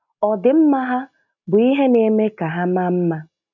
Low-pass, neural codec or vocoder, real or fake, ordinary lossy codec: 7.2 kHz; none; real; none